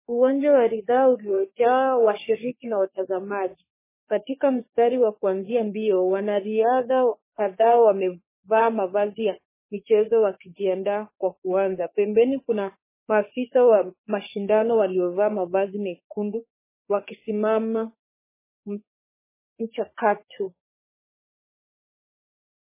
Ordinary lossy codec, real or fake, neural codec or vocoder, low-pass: MP3, 16 kbps; fake; codec, 44.1 kHz, 3.4 kbps, Pupu-Codec; 3.6 kHz